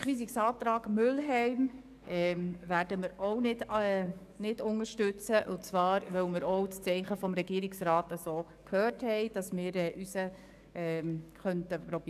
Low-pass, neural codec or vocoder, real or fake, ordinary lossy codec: 14.4 kHz; codec, 44.1 kHz, 7.8 kbps, DAC; fake; none